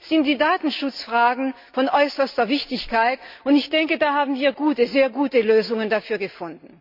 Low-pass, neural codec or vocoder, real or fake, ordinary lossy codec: 5.4 kHz; none; real; MP3, 48 kbps